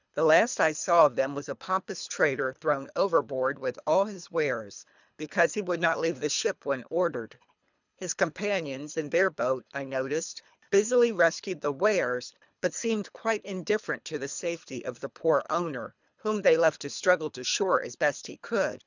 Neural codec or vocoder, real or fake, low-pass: codec, 24 kHz, 3 kbps, HILCodec; fake; 7.2 kHz